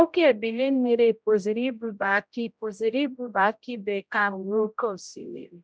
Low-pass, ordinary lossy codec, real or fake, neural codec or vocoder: none; none; fake; codec, 16 kHz, 0.5 kbps, X-Codec, HuBERT features, trained on general audio